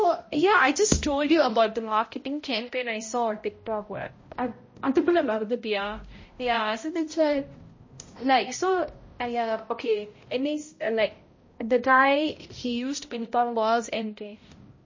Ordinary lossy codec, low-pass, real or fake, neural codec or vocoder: MP3, 32 kbps; 7.2 kHz; fake; codec, 16 kHz, 0.5 kbps, X-Codec, HuBERT features, trained on balanced general audio